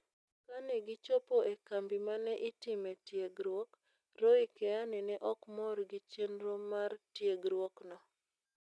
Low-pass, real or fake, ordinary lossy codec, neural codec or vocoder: none; real; none; none